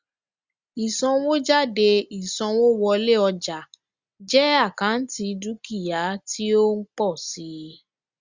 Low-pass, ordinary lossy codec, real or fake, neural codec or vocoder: 7.2 kHz; Opus, 64 kbps; real; none